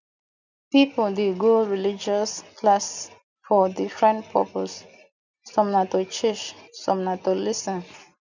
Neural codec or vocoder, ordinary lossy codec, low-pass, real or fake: none; none; 7.2 kHz; real